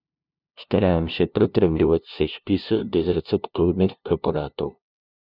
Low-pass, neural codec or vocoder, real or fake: 5.4 kHz; codec, 16 kHz, 0.5 kbps, FunCodec, trained on LibriTTS, 25 frames a second; fake